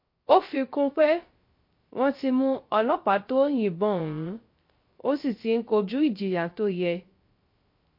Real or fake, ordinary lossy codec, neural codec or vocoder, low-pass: fake; MP3, 32 kbps; codec, 16 kHz, 0.3 kbps, FocalCodec; 5.4 kHz